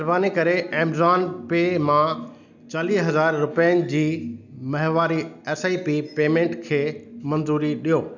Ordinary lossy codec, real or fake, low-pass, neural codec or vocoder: none; real; 7.2 kHz; none